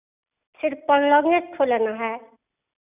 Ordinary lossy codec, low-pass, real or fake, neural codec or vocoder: none; 3.6 kHz; real; none